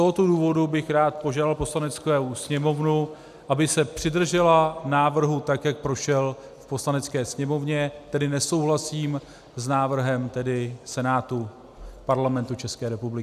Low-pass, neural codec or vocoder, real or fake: 14.4 kHz; none; real